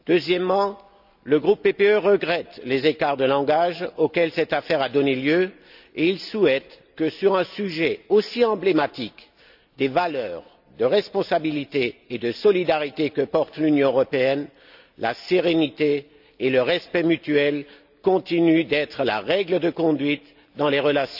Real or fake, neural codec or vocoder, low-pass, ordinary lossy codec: real; none; 5.4 kHz; none